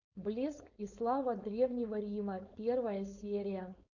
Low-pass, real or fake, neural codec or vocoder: 7.2 kHz; fake; codec, 16 kHz, 4.8 kbps, FACodec